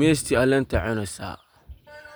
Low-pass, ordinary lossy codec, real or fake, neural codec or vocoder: none; none; real; none